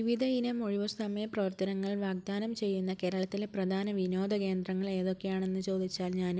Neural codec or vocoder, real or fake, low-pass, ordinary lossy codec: none; real; none; none